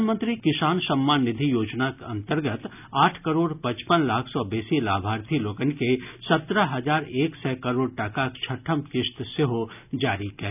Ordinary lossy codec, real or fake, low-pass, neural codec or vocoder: none; real; 3.6 kHz; none